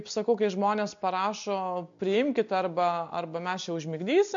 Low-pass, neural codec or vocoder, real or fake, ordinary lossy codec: 7.2 kHz; none; real; MP3, 48 kbps